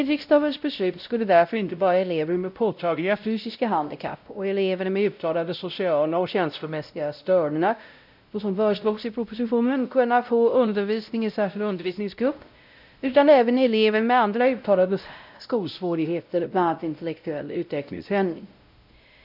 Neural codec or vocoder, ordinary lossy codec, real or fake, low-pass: codec, 16 kHz, 0.5 kbps, X-Codec, WavLM features, trained on Multilingual LibriSpeech; none; fake; 5.4 kHz